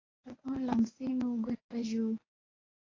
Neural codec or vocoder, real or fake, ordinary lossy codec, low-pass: codec, 24 kHz, 0.9 kbps, WavTokenizer, medium speech release version 1; fake; AAC, 32 kbps; 7.2 kHz